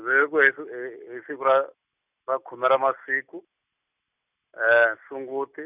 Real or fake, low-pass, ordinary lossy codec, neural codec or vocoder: real; 3.6 kHz; none; none